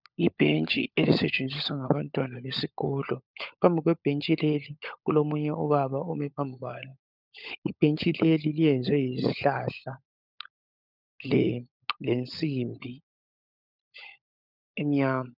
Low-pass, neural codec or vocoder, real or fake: 5.4 kHz; codec, 16 kHz, 16 kbps, FunCodec, trained on LibriTTS, 50 frames a second; fake